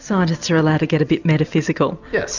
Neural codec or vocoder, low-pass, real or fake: none; 7.2 kHz; real